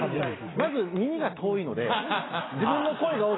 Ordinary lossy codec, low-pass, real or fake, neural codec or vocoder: AAC, 16 kbps; 7.2 kHz; real; none